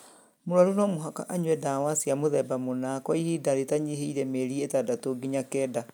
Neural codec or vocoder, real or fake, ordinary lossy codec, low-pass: none; real; none; none